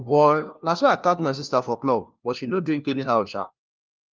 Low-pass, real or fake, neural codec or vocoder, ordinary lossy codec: 7.2 kHz; fake; codec, 16 kHz, 1 kbps, FunCodec, trained on LibriTTS, 50 frames a second; Opus, 24 kbps